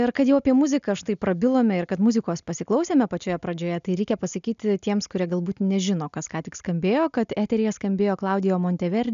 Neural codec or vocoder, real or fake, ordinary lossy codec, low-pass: none; real; MP3, 96 kbps; 7.2 kHz